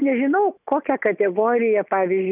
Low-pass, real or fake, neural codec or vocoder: 3.6 kHz; real; none